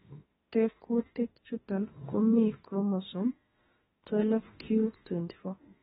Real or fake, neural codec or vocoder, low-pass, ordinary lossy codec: fake; autoencoder, 48 kHz, 32 numbers a frame, DAC-VAE, trained on Japanese speech; 19.8 kHz; AAC, 16 kbps